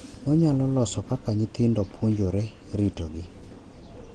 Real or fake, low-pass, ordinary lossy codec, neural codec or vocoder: real; 9.9 kHz; Opus, 16 kbps; none